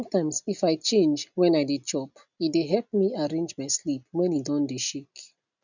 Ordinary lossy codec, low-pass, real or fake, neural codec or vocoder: none; 7.2 kHz; real; none